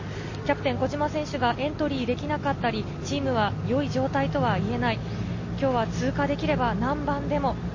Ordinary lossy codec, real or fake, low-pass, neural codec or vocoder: MP3, 32 kbps; real; 7.2 kHz; none